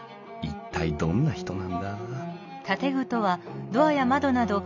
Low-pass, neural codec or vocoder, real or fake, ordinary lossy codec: 7.2 kHz; none; real; none